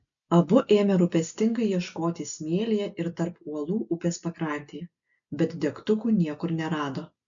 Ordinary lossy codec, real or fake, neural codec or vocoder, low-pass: MP3, 96 kbps; real; none; 7.2 kHz